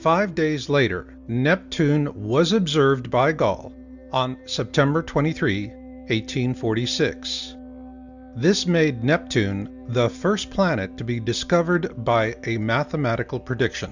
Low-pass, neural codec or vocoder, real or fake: 7.2 kHz; none; real